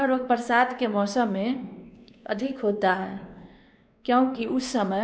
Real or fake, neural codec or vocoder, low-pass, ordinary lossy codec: fake; codec, 16 kHz, 2 kbps, X-Codec, WavLM features, trained on Multilingual LibriSpeech; none; none